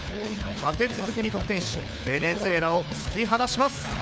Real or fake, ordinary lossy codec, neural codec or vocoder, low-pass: fake; none; codec, 16 kHz, 4 kbps, FunCodec, trained on LibriTTS, 50 frames a second; none